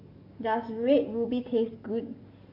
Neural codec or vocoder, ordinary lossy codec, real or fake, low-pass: codec, 44.1 kHz, 7.8 kbps, DAC; none; fake; 5.4 kHz